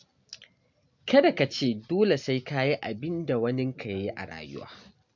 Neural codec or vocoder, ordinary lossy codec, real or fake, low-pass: none; MP3, 64 kbps; real; 7.2 kHz